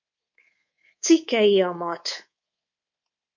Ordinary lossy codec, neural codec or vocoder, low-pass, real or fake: MP3, 48 kbps; codec, 24 kHz, 3.1 kbps, DualCodec; 7.2 kHz; fake